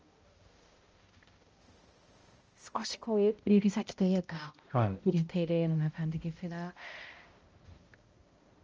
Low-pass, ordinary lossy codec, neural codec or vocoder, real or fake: 7.2 kHz; Opus, 24 kbps; codec, 16 kHz, 0.5 kbps, X-Codec, HuBERT features, trained on balanced general audio; fake